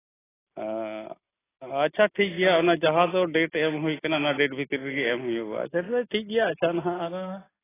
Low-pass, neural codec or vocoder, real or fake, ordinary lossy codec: 3.6 kHz; none; real; AAC, 16 kbps